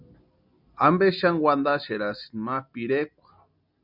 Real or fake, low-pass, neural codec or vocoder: real; 5.4 kHz; none